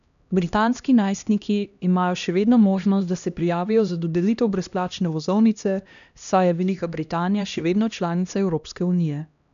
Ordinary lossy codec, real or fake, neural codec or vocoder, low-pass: none; fake; codec, 16 kHz, 1 kbps, X-Codec, HuBERT features, trained on LibriSpeech; 7.2 kHz